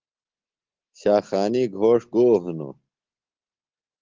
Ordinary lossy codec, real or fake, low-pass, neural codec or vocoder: Opus, 16 kbps; real; 7.2 kHz; none